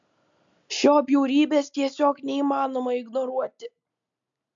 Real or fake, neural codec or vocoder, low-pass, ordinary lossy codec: real; none; 7.2 kHz; MP3, 64 kbps